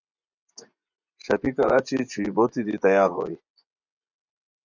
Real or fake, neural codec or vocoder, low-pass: fake; vocoder, 24 kHz, 100 mel bands, Vocos; 7.2 kHz